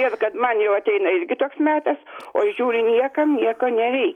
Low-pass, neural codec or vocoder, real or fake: 19.8 kHz; none; real